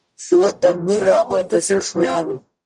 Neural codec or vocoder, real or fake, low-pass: codec, 44.1 kHz, 0.9 kbps, DAC; fake; 10.8 kHz